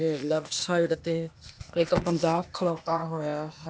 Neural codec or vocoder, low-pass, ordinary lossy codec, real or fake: codec, 16 kHz, 0.8 kbps, ZipCodec; none; none; fake